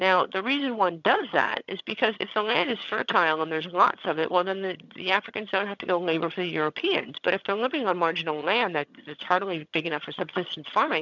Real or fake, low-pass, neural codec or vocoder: fake; 7.2 kHz; vocoder, 22.05 kHz, 80 mel bands, HiFi-GAN